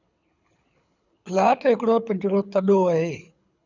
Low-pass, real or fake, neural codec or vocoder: 7.2 kHz; fake; codec, 24 kHz, 6 kbps, HILCodec